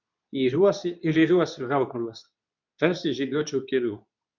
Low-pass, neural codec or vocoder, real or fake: 7.2 kHz; codec, 24 kHz, 0.9 kbps, WavTokenizer, medium speech release version 2; fake